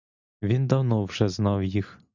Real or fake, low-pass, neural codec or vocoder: real; 7.2 kHz; none